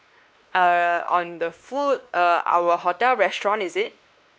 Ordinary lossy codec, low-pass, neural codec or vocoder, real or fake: none; none; codec, 16 kHz, 2 kbps, X-Codec, WavLM features, trained on Multilingual LibriSpeech; fake